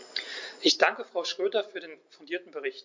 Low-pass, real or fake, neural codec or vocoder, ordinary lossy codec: 7.2 kHz; real; none; MP3, 48 kbps